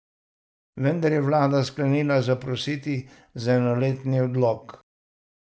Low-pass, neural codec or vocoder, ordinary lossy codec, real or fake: none; none; none; real